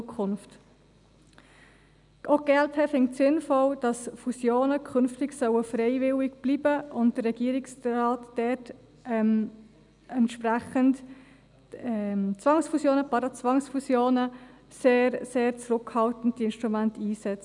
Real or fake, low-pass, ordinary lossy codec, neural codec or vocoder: real; 10.8 kHz; none; none